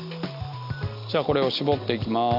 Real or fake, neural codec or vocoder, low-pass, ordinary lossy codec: real; none; 5.4 kHz; AAC, 48 kbps